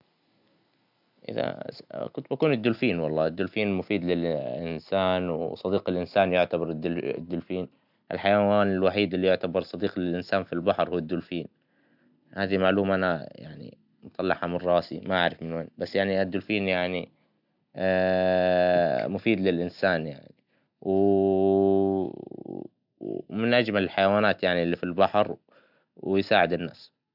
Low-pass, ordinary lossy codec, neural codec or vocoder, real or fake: 5.4 kHz; none; none; real